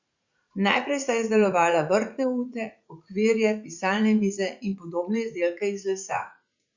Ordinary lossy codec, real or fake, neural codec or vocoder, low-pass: Opus, 64 kbps; fake; vocoder, 44.1 kHz, 80 mel bands, Vocos; 7.2 kHz